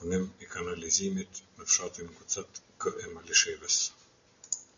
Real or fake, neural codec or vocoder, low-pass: real; none; 7.2 kHz